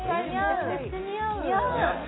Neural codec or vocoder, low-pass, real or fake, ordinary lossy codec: none; 7.2 kHz; real; AAC, 16 kbps